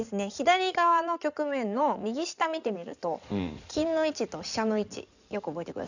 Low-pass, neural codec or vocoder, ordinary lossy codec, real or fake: 7.2 kHz; codec, 16 kHz in and 24 kHz out, 2.2 kbps, FireRedTTS-2 codec; none; fake